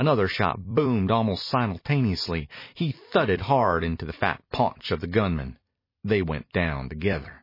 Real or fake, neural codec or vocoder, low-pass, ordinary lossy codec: fake; vocoder, 44.1 kHz, 128 mel bands every 256 samples, BigVGAN v2; 5.4 kHz; MP3, 24 kbps